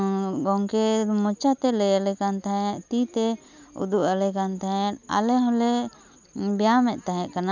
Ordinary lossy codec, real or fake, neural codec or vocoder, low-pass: none; real; none; 7.2 kHz